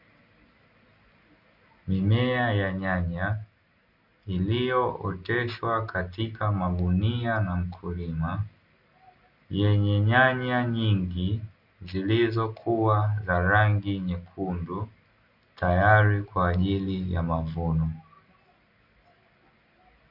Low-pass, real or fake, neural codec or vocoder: 5.4 kHz; real; none